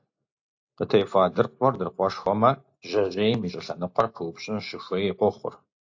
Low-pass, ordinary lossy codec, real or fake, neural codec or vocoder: 7.2 kHz; AAC, 48 kbps; real; none